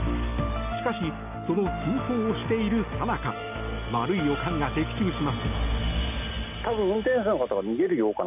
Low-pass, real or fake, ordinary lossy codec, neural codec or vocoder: 3.6 kHz; real; none; none